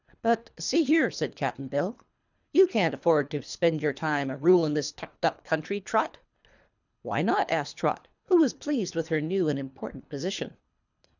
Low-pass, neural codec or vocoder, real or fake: 7.2 kHz; codec, 24 kHz, 3 kbps, HILCodec; fake